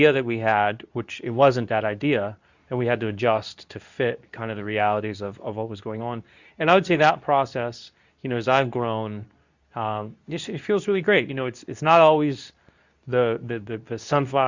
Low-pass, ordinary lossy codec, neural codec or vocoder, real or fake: 7.2 kHz; Opus, 64 kbps; codec, 24 kHz, 0.9 kbps, WavTokenizer, medium speech release version 2; fake